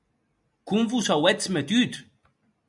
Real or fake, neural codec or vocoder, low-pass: real; none; 10.8 kHz